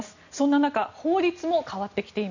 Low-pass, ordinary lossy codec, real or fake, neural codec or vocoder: 7.2 kHz; none; real; none